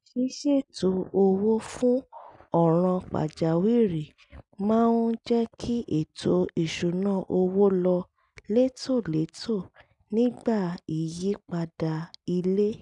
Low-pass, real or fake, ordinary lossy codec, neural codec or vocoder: 10.8 kHz; real; none; none